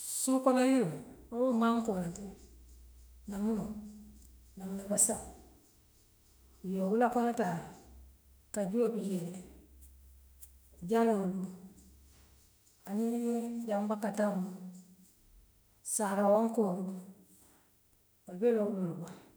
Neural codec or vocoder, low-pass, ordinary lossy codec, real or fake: autoencoder, 48 kHz, 32 numbers a frame, DAC-VAE, trained on Japanese speech; none; none; fake